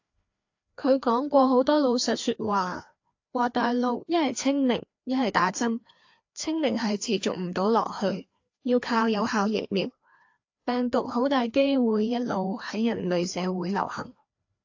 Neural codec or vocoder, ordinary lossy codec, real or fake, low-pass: codec, 16 kHz, 2 kbps, FreqCodec, larger model; AAC, 48 kbps; fake; 7.2 kHz